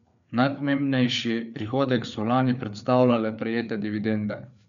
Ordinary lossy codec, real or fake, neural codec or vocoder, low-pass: none; fake; codec, 16 kHz, 4 kbps, FreqCodec, larger model; 7.2 kHz